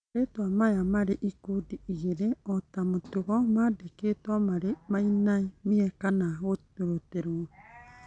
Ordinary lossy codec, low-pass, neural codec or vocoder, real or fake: none; 9.9 kHz; none; real